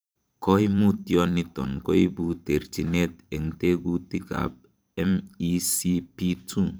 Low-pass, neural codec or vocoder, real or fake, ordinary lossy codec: none; vocoder, 44.1 kHz, 128 mel bands every 512 samples, BigVGAN v2; fake; none